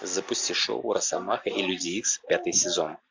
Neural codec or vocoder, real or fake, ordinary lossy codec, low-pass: none; real; MP3, 64 kbps; 7.2 kHz